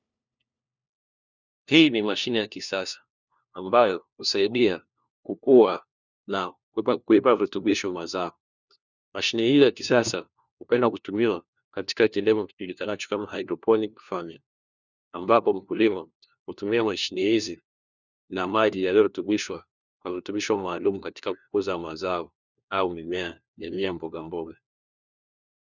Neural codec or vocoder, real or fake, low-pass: codec, 16 kHz, 1 kbps, FunCodec, trained on LibriTTS, 50 frames a second; fake; 7.2 kHz